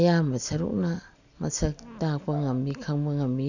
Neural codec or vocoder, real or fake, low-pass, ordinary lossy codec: none; real; 7.2 kHz; none